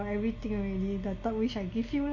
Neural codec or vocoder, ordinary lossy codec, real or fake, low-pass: none; MP3, 32 kbps; real; 7.2 kHz